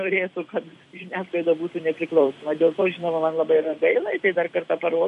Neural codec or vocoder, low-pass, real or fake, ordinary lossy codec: vocoder, 44.1 kHz, 128 mel bands every 256 samples, BigVGAN v2; 14.4 kHz; fake; MP3, 48 kbps